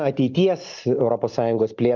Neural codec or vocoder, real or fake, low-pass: none; real; 7.2 kHz